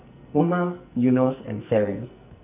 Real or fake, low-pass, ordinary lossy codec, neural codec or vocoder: fake; 3.6 kHz; none; codec, 44.1 kHz, 2.6 kbps, SNAC